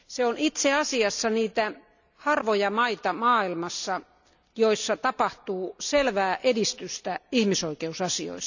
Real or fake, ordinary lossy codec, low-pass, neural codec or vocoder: real; none; 7.2 kHz; none